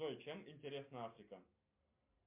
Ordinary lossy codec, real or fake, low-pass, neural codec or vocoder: MP3, 32 kbps; real; 3.6 kHz; none